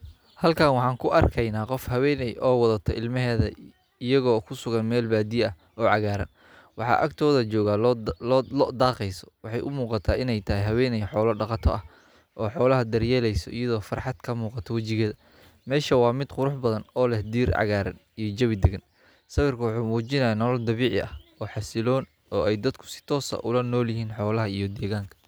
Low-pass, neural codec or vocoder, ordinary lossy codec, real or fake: none; none; none; real